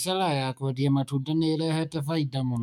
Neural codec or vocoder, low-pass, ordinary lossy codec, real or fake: autoencoder, 48 kHz, 128 numbers a frame, DAC-VAE, trained on Japanese speech; 19.8 kHz; none; fake